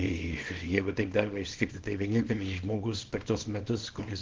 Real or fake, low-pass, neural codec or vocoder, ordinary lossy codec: fake; 7.2 kHz; codec, 24 kHz, 0.9 kbps, WavTokenizer, small release; Opus, 16 kbps